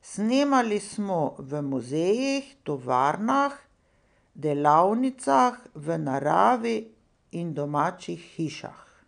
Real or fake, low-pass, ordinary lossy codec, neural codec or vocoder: real; 9.9 kHz; none; none